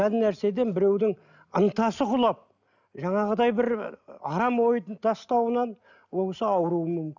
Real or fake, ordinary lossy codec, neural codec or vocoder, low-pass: real; none; none; 7.2 kHz